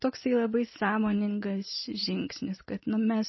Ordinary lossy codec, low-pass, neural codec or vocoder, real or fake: MP3, 24 kbps; 7.2 kHz; none; real